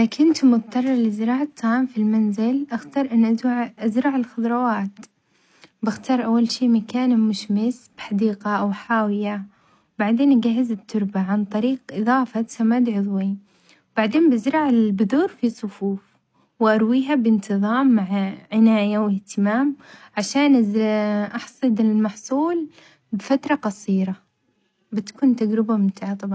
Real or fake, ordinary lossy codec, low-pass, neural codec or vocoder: real; none; none; none